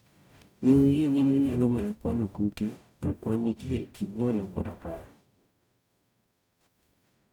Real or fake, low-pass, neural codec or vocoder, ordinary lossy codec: fake; 19.8 kHz; codec, 44.1 kHz, 0.9 kbps, DAC; none